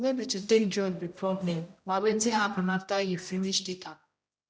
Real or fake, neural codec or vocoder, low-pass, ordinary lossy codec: fake; codec, 16 kHz, 0.5 kbps, X-Codec, HuBERT features, trained on general audio; none; none